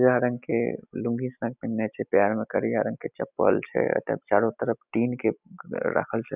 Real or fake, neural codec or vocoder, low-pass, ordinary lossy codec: real; none; 3.6 kHz; none